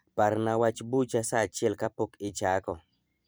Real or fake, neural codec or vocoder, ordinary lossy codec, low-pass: fake; vocoder, 44.1 kHz, 128 mel bands every 256 samples, BigVGAN v2; none; none